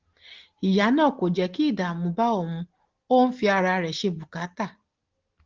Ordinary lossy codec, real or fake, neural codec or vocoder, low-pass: Opus, 16 kbps; real; none; 7.2 kHz